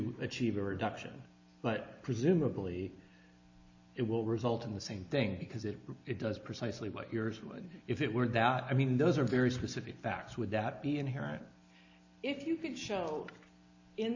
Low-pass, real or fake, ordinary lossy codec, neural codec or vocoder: 7.2 kHz; real; AAC, 48 kbps; none